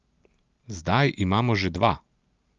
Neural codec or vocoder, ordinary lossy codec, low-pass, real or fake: none; Opus, 32 kbps; 7.2 kHz; real